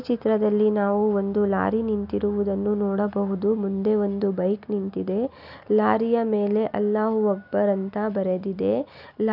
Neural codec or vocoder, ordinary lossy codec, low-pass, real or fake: none; none; 5.4 kHz; real